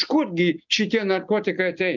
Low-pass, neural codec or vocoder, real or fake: 7.2 kHz; none; real